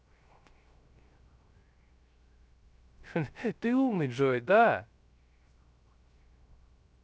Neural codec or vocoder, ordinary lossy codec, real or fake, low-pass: codec, 16 kHz, 0.3 kbps, FocalCodec; none; fake; none